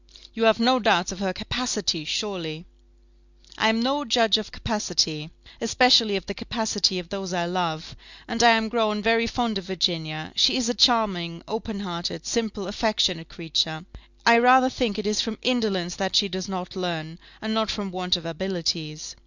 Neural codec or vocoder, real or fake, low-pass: none; real; 7.2 kHz